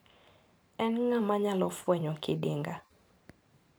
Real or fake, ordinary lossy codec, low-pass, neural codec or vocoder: fake; none; none; vocoder, 44.1 kHz, 128 mel bands every 512 samples, BigVGAN v2